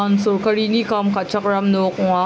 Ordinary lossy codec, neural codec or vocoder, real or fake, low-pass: none; none; real; none